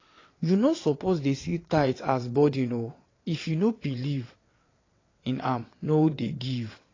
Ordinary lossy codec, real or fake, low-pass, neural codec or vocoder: AAC, 32 kbps; fake; 7.2 kHz; vocoder, 22.05 kHz, 80 mel bands, WaveNeXt